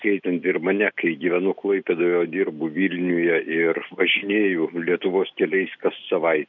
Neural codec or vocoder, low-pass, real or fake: none; 7.2 kHz; real